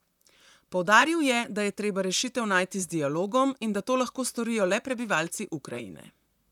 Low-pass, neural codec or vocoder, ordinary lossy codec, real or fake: 19.8 kHz; vocoder, 44.1 kHz, 128 mel bands, Pupu-Vocoder; none; fake